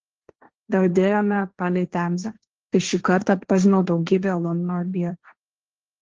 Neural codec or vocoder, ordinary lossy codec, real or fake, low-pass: codec, 16 kHz, 1.1 kbps, Voila-Tokenizer; Opus, 16 kbps; fake; 7.2 kHz